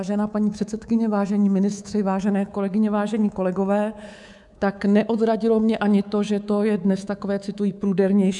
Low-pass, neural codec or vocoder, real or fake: 10.8 kHz; codec, 24 kHz, 3.1 kbps, DualCodec; fake